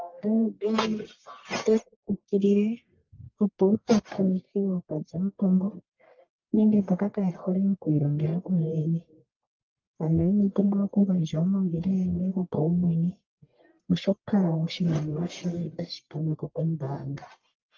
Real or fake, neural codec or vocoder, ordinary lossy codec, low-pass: fake; codec, 44.1 kHz, 1.7 kbps, Pupu-Codec; Opus, 32 kbps; 7.2 kHz